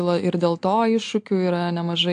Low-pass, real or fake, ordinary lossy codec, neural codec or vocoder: 9.9 kHz; real; AAC, 64 kbps; none